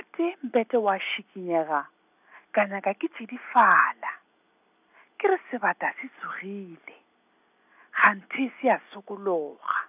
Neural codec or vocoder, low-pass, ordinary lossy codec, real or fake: none; 3.6 kHz; none; real